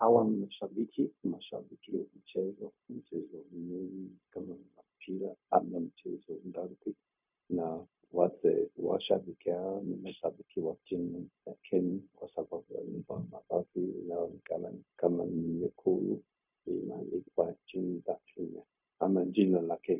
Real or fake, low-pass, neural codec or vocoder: fake; 3.6 kHz; codec, 16 kHz, 0.4 kbps, LongCat-Audio-Codec